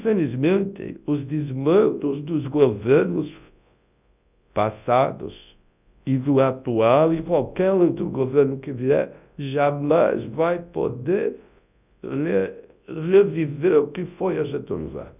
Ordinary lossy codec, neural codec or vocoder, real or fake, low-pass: none; codec, 24 kHz, 0.9 kbps, WavTokenizer, large speech release; fake; 3.6 kHz